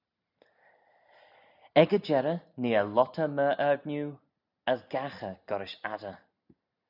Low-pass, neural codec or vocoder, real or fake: 5.4 kHz; none; real